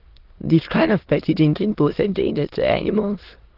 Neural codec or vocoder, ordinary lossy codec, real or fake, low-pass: autoencoder, 22.05 kHz, a latent of 192 numbers a frame, VITS, trained on many speakers; Opus, 16 kbps; fake; 5.4 kHz